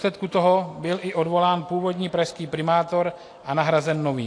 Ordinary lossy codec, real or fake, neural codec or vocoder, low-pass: AAC, 48 kbps; real; none; 9.9 kHz